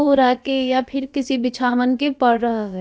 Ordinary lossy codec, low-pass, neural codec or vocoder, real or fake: none; none; codec, 16 kHz, about 1 kbps, DyCAST, with the encoder's durations; fake